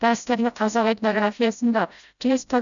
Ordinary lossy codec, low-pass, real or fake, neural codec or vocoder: none; 7.2 kHz; fake; codec, 16 kHz, 0.5 kbps, FreqCodec, smaller model